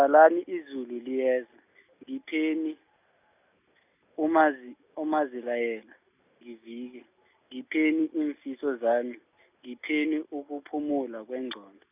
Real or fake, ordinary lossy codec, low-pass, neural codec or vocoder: real; none; 3.6 kHz; none